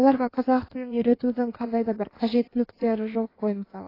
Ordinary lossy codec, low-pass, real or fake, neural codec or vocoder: AAC, 24 kbps; 5.4 kHz; fake; codec, 16 kHz in and 24 kHz out, 1.1 kbps, FireRedTTS-2 codec